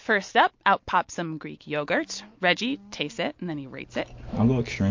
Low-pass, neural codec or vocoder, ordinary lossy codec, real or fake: 7.2 kHz; none; MP3, 48 kbps; real